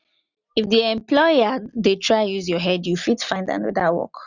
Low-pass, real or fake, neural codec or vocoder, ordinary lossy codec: 7.2 kHz; real; none; none